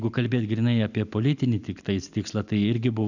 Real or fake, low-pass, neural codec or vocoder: real; 7.2 kHz; none